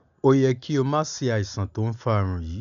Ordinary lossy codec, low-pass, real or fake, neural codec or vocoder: none; 7.2 kHz; real; none